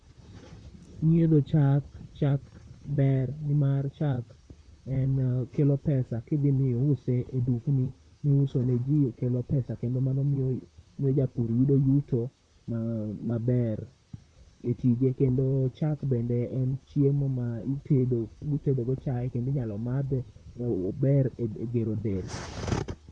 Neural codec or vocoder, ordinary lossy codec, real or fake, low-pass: vocoder, 44.1 kHz, 128 mel bands, Pupu-Vocoder; none; fake; 9.9 kHz